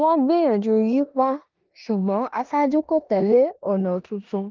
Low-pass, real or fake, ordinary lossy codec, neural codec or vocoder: 7.2 kHz; fake; Opus, 32 kbps; codec, 16 kHz in and 24 kHz out, 0.9 kbps, LongCat-Audio-Codec, four codebook decoder